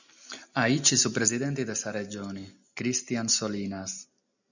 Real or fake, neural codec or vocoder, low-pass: real; none; 7.2 kHz